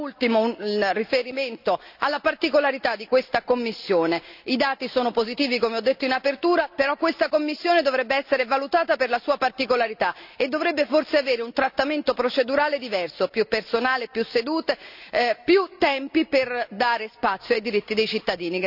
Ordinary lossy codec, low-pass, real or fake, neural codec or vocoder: none; 5.4 kHz; real; none